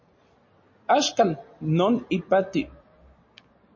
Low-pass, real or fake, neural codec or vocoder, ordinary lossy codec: 7.2 kHz; real; none; MP3, 32 kbps